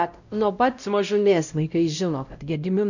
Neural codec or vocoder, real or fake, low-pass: codec, 16 kHz, 0.5 kbps, X-Codec, WavLM features, trained on Multilingual LibriSpeech; fake; 7.2 kHz